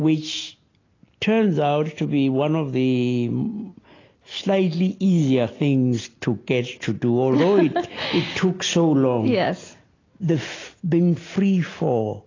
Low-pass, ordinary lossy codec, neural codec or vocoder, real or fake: 7.2 kHz; AAC, 32 kbps; none; real